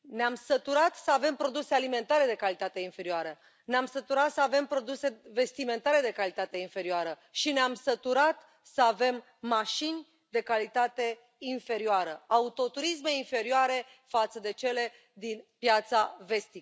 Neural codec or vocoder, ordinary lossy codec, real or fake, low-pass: none; none; real; none